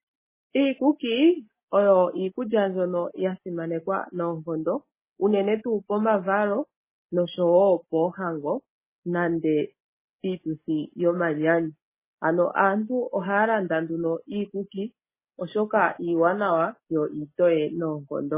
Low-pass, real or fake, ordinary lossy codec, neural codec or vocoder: 3.6 kHz; real; MP3, 16 kbps; none